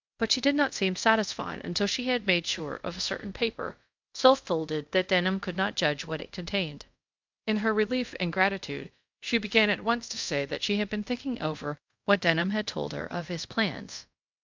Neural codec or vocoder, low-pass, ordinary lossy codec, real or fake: codec, 24 kHz, 0.5 kbps, DualCodec; 7.2 kHz; MP3, 64 kbps; fake